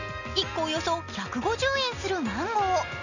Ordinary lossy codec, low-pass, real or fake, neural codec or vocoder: none; 7.2 kHz; real; none